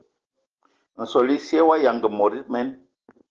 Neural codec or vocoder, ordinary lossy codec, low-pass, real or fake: none; Opus, 16 kbps; 7.2 kHz; real